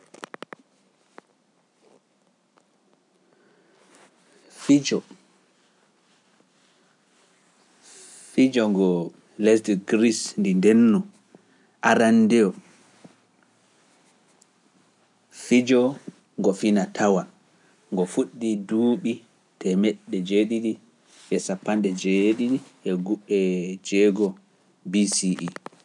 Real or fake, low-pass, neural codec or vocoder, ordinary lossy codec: real; 10.8 kHz; none; none